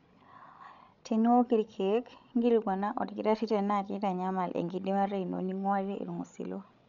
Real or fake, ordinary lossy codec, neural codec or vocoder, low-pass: fake; none; codec, 16 kHz, 16 kbps, FreqCodec, larger model; 7.2 kHz